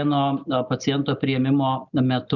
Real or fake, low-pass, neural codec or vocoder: real; 7.2 kHz; none